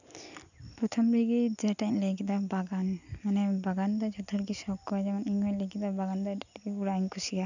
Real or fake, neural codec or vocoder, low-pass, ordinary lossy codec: real; none; 7.2 kHz; none